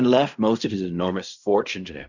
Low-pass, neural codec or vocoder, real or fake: 7.2 kHz; codec, 16 kHz in and 24 kHz out, 0.4 kbps, LongCat-Audio-Codec, fine tuned four codebook decoder; fake